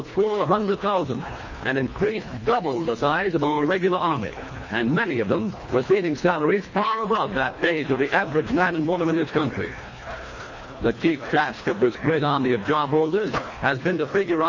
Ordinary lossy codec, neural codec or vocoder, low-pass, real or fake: MP3, 32 kbps; codec, 24 kHz, 1.5 kbps, HILCodec; 7.2 kHz; fake